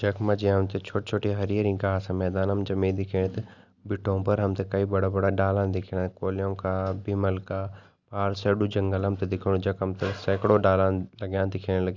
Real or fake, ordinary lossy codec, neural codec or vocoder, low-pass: real; Opus, 64 kbps; none; 7.2 kHz